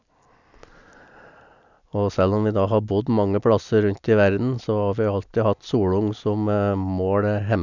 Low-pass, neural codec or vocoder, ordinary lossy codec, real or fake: 7.2 kHz; none; none; real